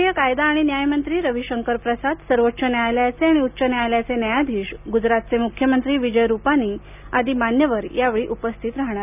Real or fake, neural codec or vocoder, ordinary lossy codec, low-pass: real; none; none; 3.6 kHz